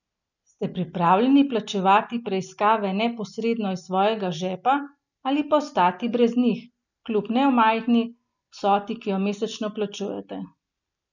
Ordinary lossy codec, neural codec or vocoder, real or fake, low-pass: none; none; real; 7.2 kHz